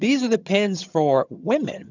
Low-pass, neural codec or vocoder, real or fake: 7.2 kHz; vocoder, 22.05 kHz, 80 mel bands, HiFi-GAN; fake